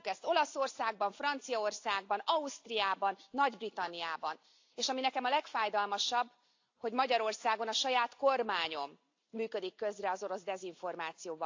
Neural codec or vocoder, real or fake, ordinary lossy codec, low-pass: none; real; AAC, 48 kbps; 7.2 kHz